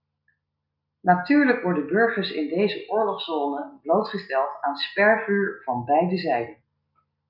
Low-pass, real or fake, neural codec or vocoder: 5.4 kHz; real; none